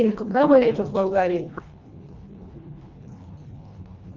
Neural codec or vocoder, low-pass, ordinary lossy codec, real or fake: codec, 24 kHz, 1.5 kbps, HILCodec; 7.2 kHz; Opus, 24 kbps; fake